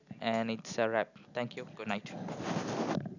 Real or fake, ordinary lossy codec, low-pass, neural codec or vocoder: real; none; 7.2 kHz; none